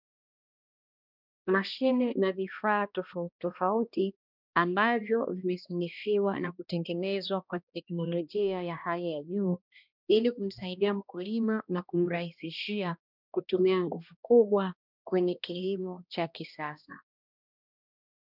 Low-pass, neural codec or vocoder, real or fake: 5.4 kHz; codec, 16 kHz, 1 kbps, X-Codec, HuBERT features, trained on balanced general audio; fake